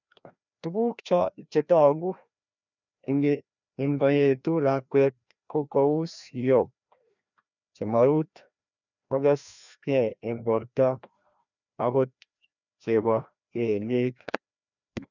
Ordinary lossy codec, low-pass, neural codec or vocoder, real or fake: none; 7.2 kHz; codec, 16 kHz, 1 kbps, FreqCodec, larger model; fake